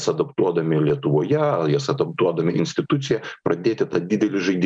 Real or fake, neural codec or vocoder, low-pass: real; none; 9.9 kHz